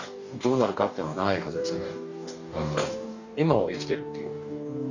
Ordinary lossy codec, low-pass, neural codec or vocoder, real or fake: none; 7.2 kHz; codec, 44.1 kHz, 2.6 kbps, DAC; fake